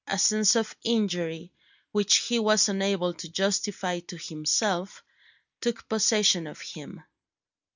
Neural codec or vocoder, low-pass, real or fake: none; 7.2 kHz; real